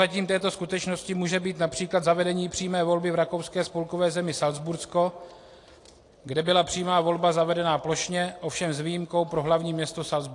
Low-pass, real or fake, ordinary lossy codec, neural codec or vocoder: 10.8 kHz; real; AAC, 48 kbps; none